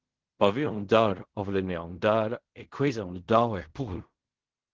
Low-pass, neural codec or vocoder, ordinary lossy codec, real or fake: 7.2 kHz; codec, 16 kHz in and 24 kHz out, 0.4 kbps, LongCat-Audio-Codec, fine tuned four codebook decoder; Opus, 16 kbps; fake